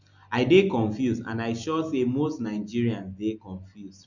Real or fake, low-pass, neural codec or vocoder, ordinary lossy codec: real; 7.2 kHz; none; none